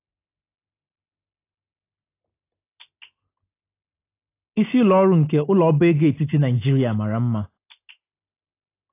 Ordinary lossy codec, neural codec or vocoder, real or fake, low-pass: AAC, 24 kbps; none; real; 3.6 kHz